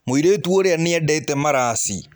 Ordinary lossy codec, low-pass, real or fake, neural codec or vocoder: none; none; real; none